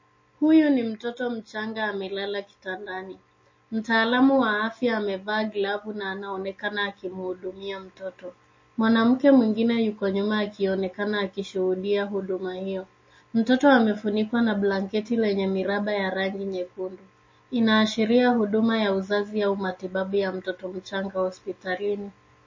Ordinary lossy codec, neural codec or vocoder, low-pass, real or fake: MP3, 32 kbps; none; 7.2 kHz; real